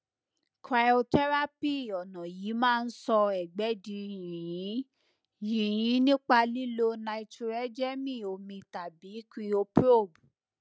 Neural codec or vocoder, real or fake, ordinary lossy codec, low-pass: none; real; none; none